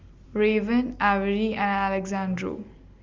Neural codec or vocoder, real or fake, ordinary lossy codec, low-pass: none; real; Opus, 32 kbps; 7.2 kHz